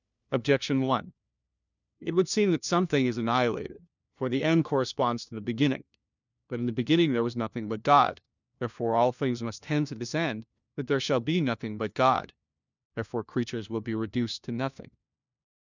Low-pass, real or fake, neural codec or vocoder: 7.2 kHz; fake; codec, 16 kHz, 1 kbps, FunCodec, trained on LibriTTS, 50 frames a second